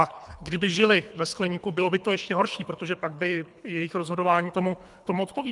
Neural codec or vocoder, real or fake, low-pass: codec, 24 kHz, 3 kbps, HILCodec; fake; 10.8 kHz